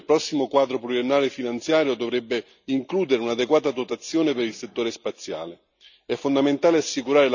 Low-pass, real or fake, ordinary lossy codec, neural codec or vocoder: 7.2 kHz; real; none; none